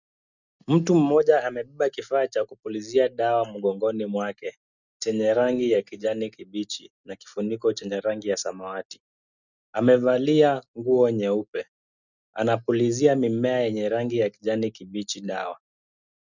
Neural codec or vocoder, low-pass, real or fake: none; 7.2 kHz; real